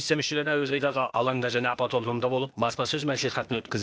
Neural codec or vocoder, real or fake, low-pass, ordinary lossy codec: codec, 16 kHz, 0.8 kbps, ZipCodec; fake; none; none